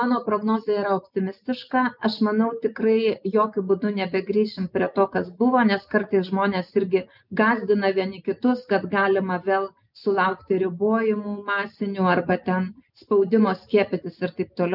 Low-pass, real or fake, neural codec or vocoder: 5.4 kHz; real; none